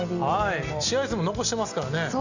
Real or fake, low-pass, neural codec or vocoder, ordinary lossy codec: real; 7.2 kHz; none; none